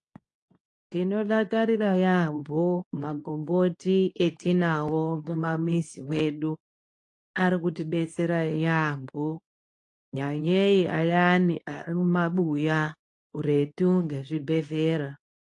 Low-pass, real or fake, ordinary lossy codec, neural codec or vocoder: 10.8 kHz; fake; AAC, 48 kbps; codec, 24 kHz, 0.9 kbps, WavTokenizer, medium speech release version 2